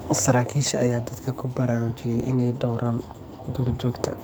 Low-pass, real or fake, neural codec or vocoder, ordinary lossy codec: none; fake; codec, 44.1 kHz, 2.6 kbps, SNAC; none